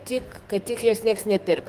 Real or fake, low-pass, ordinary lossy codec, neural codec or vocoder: fake; 14.4 kHz; Opus, 24 kbps; codec, 32 kHz, 1.9 kbps, SNAC